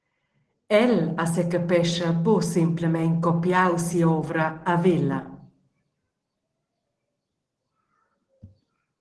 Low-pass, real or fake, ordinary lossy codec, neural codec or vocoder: 10.8 kHz; real; Opus, 16 kbps; none